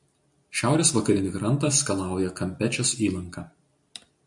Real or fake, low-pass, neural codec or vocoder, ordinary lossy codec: real; 10.8 kHz; none; MP3, 64 kbps